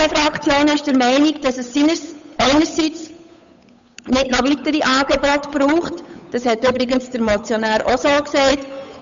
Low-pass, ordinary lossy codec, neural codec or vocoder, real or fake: 7.2 kHz; MP3, 64 kbps; codec, 16 kHz, 8 kbps, FunCodec, trained on Chinese and English, 25 frames a second; fake